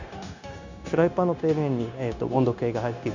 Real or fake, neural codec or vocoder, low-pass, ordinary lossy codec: fake; codec, 16 kHz, 0.9 kbps, LongCat-Audio-Codec; 7.2 kHz; none